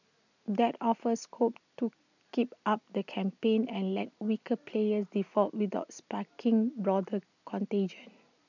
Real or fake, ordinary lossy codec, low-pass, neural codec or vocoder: real; none; 7.2 kHz; none